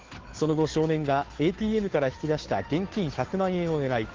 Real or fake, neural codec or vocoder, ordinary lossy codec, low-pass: fake; codec, 16 kHz, 2 kbps, FunCodec, trained on Chinese and English, 25 frames a second; Opus, 16 kbps; 7.2 kHz